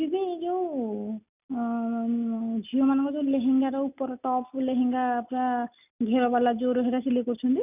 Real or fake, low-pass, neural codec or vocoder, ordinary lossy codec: real; 3.6 kHz; none; none